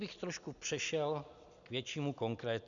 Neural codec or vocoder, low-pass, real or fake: none; 7.2 kHz; real